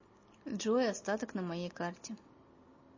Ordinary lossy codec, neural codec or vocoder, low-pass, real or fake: MP3, 32 kbps; none; 7.2 kHz; real